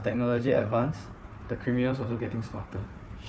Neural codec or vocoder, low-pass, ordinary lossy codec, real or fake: codec, 16 kHz, 4 kbps, FunCodec, trained on Chinese and English, 50 frames a second; none; none; fake